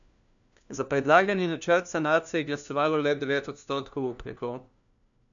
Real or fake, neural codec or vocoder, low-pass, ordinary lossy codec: fake; codec, 16 kHz, 1 kbps, FunCodec, trained on LibriTTS, 50 frames a second; 7.2 kHz; none